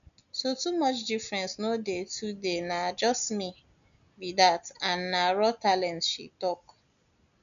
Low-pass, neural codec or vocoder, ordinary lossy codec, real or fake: 7.2 kHz; none; none; real